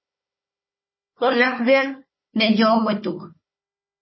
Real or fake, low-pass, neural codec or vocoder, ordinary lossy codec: fake; 7.2 kHz; codec, 16 kHz, 4 kbps, FunCodec, trained on Chinese and English, 50 frames a second; MP3, 24 kbps